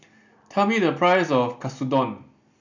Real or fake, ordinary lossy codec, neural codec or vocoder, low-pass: real; none; none; 7.2 kHz